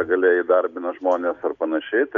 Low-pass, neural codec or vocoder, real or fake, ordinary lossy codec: 7.2 kHz; none; real; MP3, 96 kbps